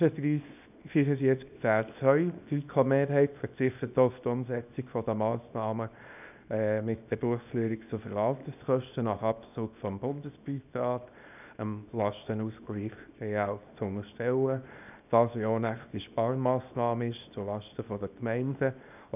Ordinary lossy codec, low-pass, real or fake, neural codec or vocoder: none; 3.6 kHz; fake; codec, 24 kHz, 0.9 kbps, WavTokenizer, small release